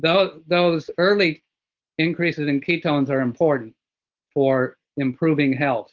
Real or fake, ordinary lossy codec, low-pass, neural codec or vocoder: real; Opus, 16 kbps; 7.2 kHz; none